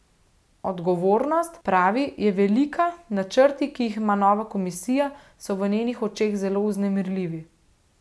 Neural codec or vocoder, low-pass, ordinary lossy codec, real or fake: none; none; none; real